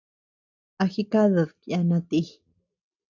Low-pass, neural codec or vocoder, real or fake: 7.2 kHz; none; real